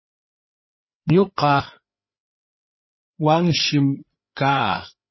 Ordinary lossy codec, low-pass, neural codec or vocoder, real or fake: MP3, 24 kbps; 7.2 kHz; codec, 16 kHz, 8 kbps, FreqCodec, larger model; fake